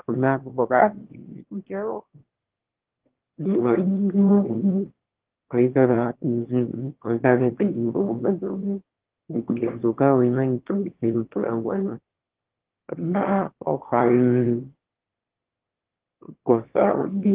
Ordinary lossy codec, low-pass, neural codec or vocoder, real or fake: Opus, 16 kbps; 3.6 kHz; autoencoder, 22.05 kHz, a latent of 192 numbers a frame, VITS, trained on one speaker; fake